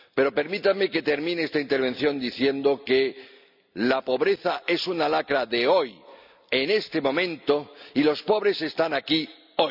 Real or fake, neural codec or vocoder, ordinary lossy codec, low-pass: real; none; none; 5.4 kHz